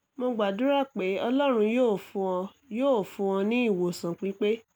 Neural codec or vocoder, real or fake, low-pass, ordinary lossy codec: none; real; none; none